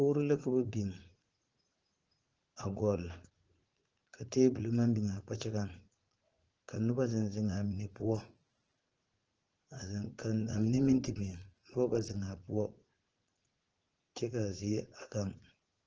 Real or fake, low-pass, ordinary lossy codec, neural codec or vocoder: fake; 7.2 kHz; Opus, 16 kbps; vocoder, 44.1 kHz, 80 mel bands, Vocos